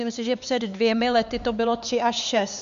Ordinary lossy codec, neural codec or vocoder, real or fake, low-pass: MP3, 64 kbps; codec, 16 kHz, 4 kbps, X-Codec, HuBERT features, trained on LibriSpeech; fake; 7.2 kHz